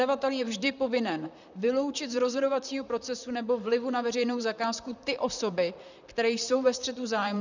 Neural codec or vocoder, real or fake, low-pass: vocoder, 44.1 kHz, 128 mel bands, Pupu-Vocoder; fake; 7.2 kHz